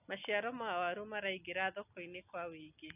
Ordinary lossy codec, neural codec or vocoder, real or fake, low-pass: none; none; real; 3.6 kHz